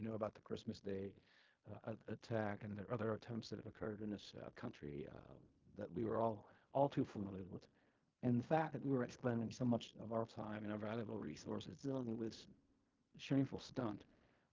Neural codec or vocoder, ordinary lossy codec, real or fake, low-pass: codec, 16 kHz in and 24 kHz out, 0.4 kbps, LongCat-Audio-Codec, fine tuned four codebook decoder; Opus, 32 kbps; fake; 7.2 kHz